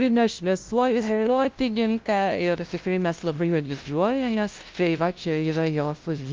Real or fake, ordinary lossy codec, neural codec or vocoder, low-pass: fake; Opus, 24 kbps; codec, 16 kHz, 0.5 kbps, FunCodec, trained on LibriTTS, 25 frames a second; 7.2 kHz